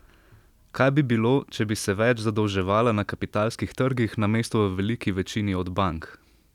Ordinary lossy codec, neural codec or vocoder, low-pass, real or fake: none; none; 19.8 kHz; real